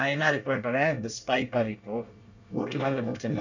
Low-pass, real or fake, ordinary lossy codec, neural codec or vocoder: 7.2 kHz; fake; none; codec, 24 kHz, 1 kbps, SNAC